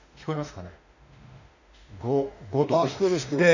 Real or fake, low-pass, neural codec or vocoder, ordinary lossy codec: fake; 7.2 kHz; autoencoder, 48 kHz, 32 numbers a frame, DAC-VAE, trained on Japanese speech; none